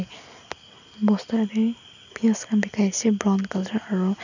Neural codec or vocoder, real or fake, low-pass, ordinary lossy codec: none; real; 7.2 kHz; none